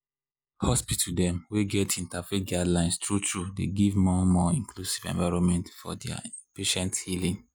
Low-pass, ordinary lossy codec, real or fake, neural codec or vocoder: none; none; real; none